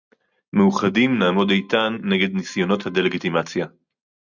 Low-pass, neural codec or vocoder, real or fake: 7.2 kHz; none; real